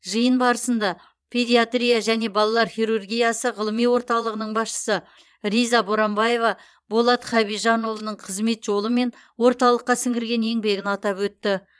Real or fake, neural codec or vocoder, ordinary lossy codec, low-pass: fake; vocoder, 22.05 kHz, 80 mel bands, Vocos; none; none